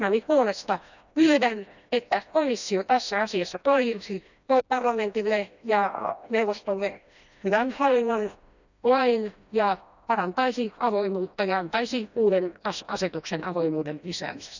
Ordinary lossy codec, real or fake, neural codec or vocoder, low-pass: none; fake; codec, 16 kHz, 1 kbps, FreqCodec, smaller model; 7.2 kHz